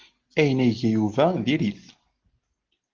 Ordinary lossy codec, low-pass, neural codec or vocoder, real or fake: Opus, 16 kbps; 7.2 kHz; none; real